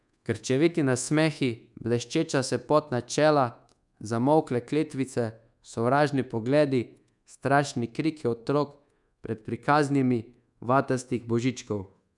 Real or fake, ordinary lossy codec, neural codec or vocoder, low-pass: fake; none; codec, 24 kHz, 1.2 kbps, DualCodec; 10.8 kHz